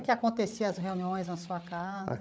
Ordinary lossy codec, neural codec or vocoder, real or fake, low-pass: none; codec, 16 kHz, 8 kbps, FreqCodec, larger model; fake; none